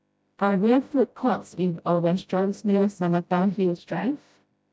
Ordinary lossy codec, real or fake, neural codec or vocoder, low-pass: none; fake; codec, 16 kHz, 0.5 kbps, FreqCodec, smaller model; none